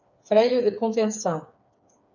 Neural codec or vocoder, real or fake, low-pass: codec, 16 kHz, 4 kbps, FreqCodec, smaller model; fake; 7.2 kHz